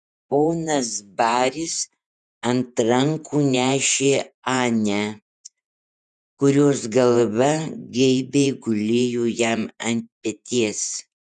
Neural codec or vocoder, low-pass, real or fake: vocoder, 48 kHz, 128 mel bands, Vocos; 10.8 kHz; fake